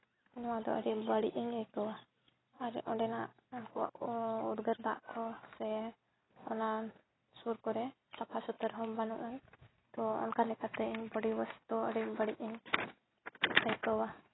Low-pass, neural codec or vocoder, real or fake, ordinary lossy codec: 7.2 kHz; none; real; AAC, 16 kbps